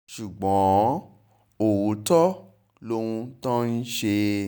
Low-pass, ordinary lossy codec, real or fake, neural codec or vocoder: none; none; real; none